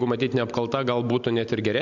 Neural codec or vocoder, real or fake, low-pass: none; real; 7.2 kHz